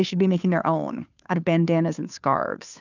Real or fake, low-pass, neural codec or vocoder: fake; 7.2 kHz; codec, 16 kHz, 2 kbps, FunCodec, trained on Chinese and English, 25 frames a second